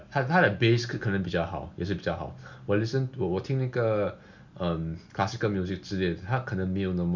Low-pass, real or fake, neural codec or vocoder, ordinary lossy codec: 7.2 kHz; real; none; none